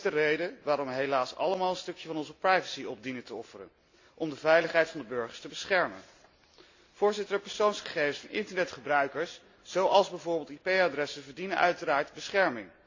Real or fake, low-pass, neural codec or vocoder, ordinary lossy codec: real; 7.2 kHz; none; AAC, 48 kbps